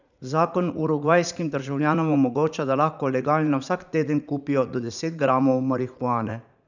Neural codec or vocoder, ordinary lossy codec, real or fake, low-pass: vocoder, 44.1 kHz, 80 mel bands, Vocos; none; fake; 7.2 kHz